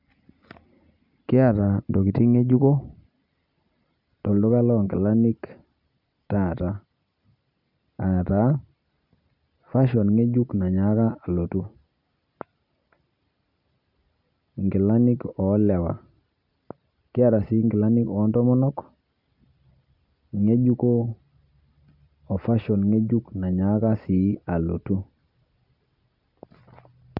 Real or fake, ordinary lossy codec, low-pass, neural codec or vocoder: real; none; 5.4 kHz; none